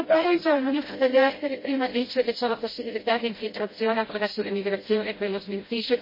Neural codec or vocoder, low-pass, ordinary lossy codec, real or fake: codec, 16 kHz, 0.5 kbps, FreqCodec, smaller model; 5.4 kHz; MP3, 24 kbps; fake